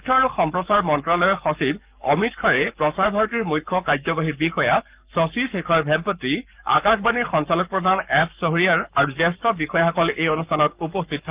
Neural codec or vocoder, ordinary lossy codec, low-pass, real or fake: codec, 44.1 kHz, 7.8 kbps, Pupu-Codec; Opus, 32 kbps; 3.6 kHz; fake